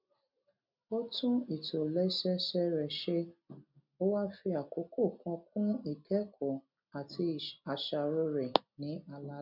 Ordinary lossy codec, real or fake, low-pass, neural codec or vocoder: none; real; 5.4 kHz; none